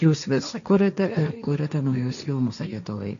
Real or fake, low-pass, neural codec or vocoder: fake; 7.2 kHz; codec, 16 kHz, 1.1 kbps, Voila-Tokenizer